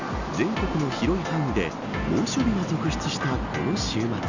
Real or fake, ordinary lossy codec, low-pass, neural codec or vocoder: real; none; 7.2 kHz; none